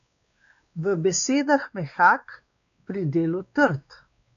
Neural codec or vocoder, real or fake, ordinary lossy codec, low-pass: codec, 16 kHz, 2 kbps, X-Codec, WavLM features, trained on Multilingual LibriSpeech; fake; AAC, 96 kbps; 7.2 kHz